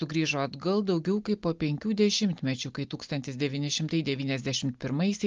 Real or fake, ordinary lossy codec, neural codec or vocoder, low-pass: real; Opus, 32 kbps; none; 7.2 kHz